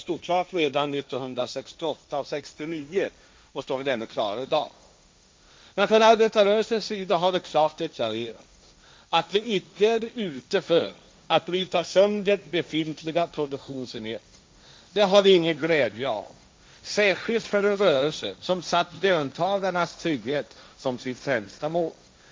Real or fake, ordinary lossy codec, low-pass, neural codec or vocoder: fake; none; none; codec, 16 kHz, 1.1 kbps, Voila-Tokenizer